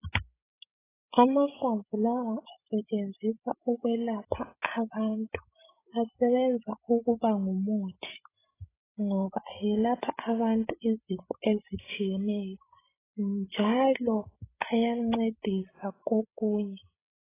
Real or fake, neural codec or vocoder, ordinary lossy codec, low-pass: fake; codec, 16 kHz, 16 kbps, FreqCodec, larger model; AAC, 16 kbps; 3.6 kHz